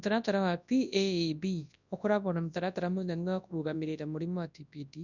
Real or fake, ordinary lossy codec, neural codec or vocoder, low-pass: fake; none; codec, 24 kHz, 0.9 kbps, WavTokenizer, large speech release; 7.2 kHz